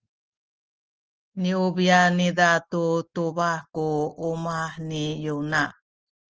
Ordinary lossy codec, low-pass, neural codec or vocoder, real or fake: Opus, 16 kbps; 7.2 kHz; none; real